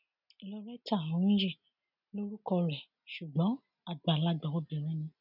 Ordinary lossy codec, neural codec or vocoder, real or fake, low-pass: none; none; real; 5.4 kHz